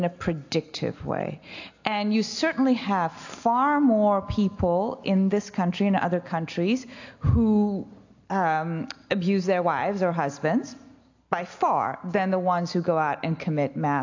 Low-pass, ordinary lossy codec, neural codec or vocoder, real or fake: 7.2 kHz; AAC, 48 kbps; none; real